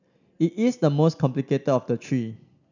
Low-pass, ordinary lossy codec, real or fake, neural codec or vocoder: 7.2 kHz; none; real; none